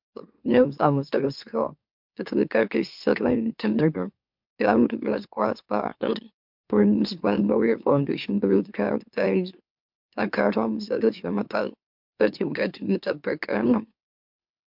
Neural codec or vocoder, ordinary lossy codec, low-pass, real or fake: autoencoder, 44.1 kHz, a latent of 192 numbers a frame, MeloTTS; MP3, 48 kbps; 5.4 kHz; fake